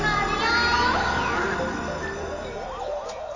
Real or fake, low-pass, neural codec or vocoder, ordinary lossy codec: real; 7.2 kHz; none; AAC, 32 kbps